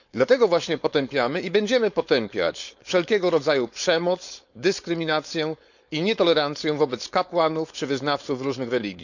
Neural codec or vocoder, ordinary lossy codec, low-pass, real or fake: codec, 16 kHz, 4.8 kbps, FACodec; none; 7.2 kHz; fake